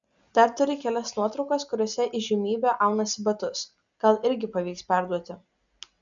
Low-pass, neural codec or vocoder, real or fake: 7.2 kHz; none; real